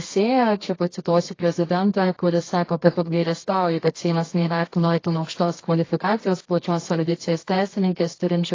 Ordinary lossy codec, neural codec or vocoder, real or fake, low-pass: AAC, 32 kbps; codec, 24 kHz, 0.9 kbps, WavTokenizer, medium music audio release; fake; 7.2 kHz